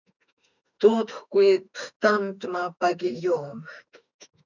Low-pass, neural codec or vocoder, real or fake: 7.2 kHz; autoencoder, 48 kHz, 32 numbers a frame, DAC-VAE, trained on Japanese speech; fake